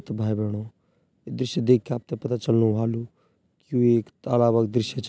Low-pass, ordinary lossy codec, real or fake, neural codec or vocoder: none; none; real; none